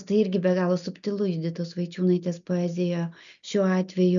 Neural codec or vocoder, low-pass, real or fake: none; 7.2 kHz; real